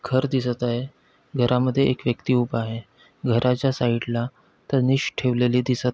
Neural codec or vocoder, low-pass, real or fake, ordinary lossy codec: none; none; real; none